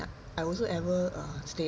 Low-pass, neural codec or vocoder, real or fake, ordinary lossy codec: none; none; real; none